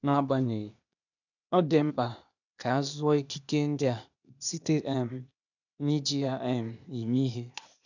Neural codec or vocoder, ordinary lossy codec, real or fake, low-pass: codec, 16 kHz, 0.8 kbps, ZipCodec; none; fake; 7.2 kHz